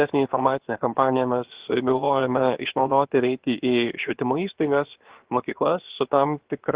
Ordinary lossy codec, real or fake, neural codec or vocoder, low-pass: Opus, 16 kbps; fake; codec, 16 kHz, 0.7 kbps, FocalCodec; 3.6 kHz